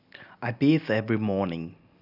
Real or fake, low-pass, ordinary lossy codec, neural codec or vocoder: real; 5.4 kHz; none; none